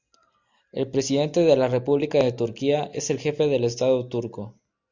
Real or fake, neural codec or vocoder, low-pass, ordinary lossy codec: real; none; 7.2 kHz; Opus, 64 kbps